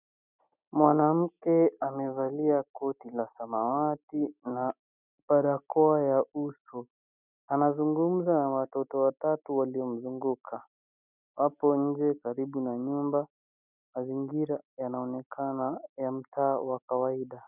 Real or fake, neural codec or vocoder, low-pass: real; none; 3.6 kHz